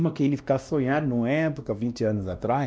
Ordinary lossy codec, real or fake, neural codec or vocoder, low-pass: none; fake; codec, 16 kHz, 1 kbps, X-Codec, WavLM features, trained on Multilingual LibriSpeech; none